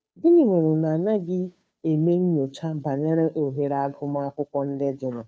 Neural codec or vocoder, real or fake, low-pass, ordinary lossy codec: codec, 16 kHz, 2 kbps, FunCodec, trained on Chinese and English, 25 frames a second; fake; none; none